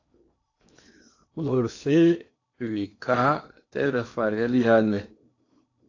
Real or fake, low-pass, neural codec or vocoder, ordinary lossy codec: fake; 7.2 kHz; codec, 16 kHz in and 24 kHz out, 0.8 kbps, FocalCodec, streaming, 65536 codes; AAC, 48 kbps